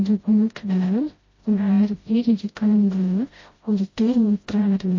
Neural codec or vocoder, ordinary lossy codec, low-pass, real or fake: codec, 16 kHz, 0.5 kbps, FreqCodec, smaller model; MP3, 32 kbps; 7.2 kHz; fake